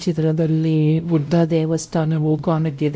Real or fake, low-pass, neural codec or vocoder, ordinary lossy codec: fake; none; codec, 16 kHz, 0.5 kbps, X-Codec, WavLM features, trained on Multilingual LibriSpeech; none